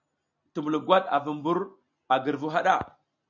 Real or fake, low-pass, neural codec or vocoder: real; 7.2 kHz; none